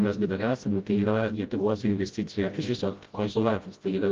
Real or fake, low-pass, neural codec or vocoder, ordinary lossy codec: fake; 7.2 kHz; codec, 16 kHz, 0.5 kbps, FreqCodec, smaller model; Opus, 32 kbps